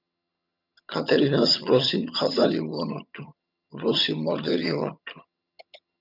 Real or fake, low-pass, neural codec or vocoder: fake; 5.4 kHz; vocoder, 22.05 kHz, 80 mel bands, HiFi-GAN